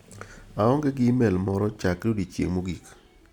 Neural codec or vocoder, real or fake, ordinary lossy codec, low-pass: none; real; none; 19.8 kHz